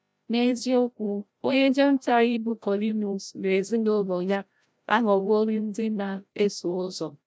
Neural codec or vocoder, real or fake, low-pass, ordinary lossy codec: codec, 16 kHz, 0.5 kbps, FreqCodec, larger model; fake; none; none